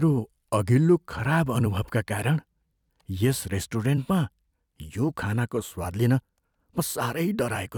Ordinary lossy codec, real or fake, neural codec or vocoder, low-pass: none; fake; vocoder, 44.1 kHz, 128 mel bands every 256 samples, BigVGAN v2; 19.8 kHz